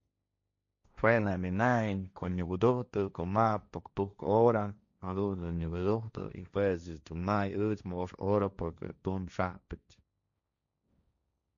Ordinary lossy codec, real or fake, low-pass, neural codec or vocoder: none; fake; 7.2 kHz; codec, 16 kHz, 1.1 kbps, Voila-Tokenizer